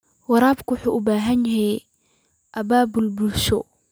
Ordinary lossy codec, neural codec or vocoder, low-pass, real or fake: none; none; none; real